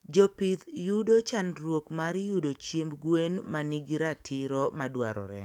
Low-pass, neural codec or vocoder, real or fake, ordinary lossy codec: 19.8 kHz; codec, 44.1 kHz, 7.8 kbps, DAC; fake; none